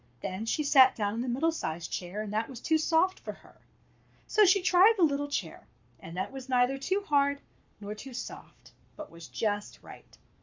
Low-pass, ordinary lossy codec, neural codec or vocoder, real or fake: 7.2 kHz; MP3, 64 kbps; codec, 44.1 kHz, 7.8 kbps, Pupu-Codec; fake